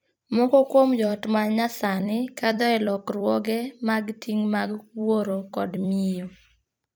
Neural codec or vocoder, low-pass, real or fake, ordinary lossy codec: vocoder, 44.1 kHz, 128 mel bands every 256 samples, BigVGAN v2; none; fake; none